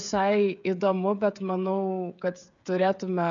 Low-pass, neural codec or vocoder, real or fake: 7.2 kHz; codec, 16 kHz, 16 kbps, FreqCodec, smaller model; fake